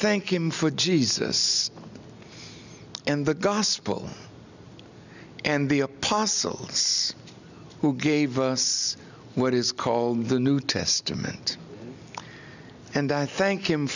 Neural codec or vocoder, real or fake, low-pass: none; real; 7.2 kHz